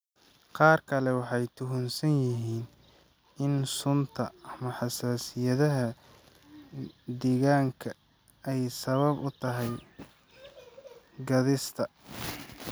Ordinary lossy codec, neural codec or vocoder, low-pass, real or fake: none; none; none; real